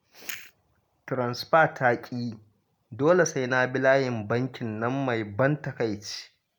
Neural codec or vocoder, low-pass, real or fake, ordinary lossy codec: none; none; real; none